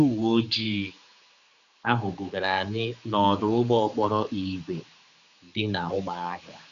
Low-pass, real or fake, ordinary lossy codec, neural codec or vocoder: 7.2 kHz; fake; Opus, 64 kbps; codec, 16 kHz, 4 kbps, X-Codec, HuBERT features, trained on general audio